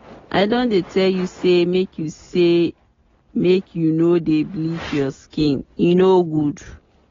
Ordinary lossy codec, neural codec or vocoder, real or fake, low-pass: AAC, 24 kbps; none; real; 7.2 kHz